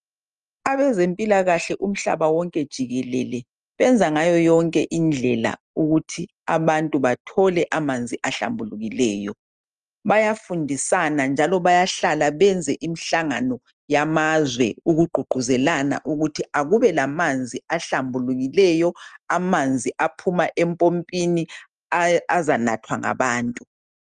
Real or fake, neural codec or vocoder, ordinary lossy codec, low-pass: real; none; Opus, 32 kbps; 9.9 kHz